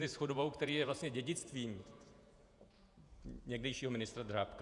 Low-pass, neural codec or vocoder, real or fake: 10.8 kHz; vocoder, 48 kHz, 128 mel bands, Vocos; fake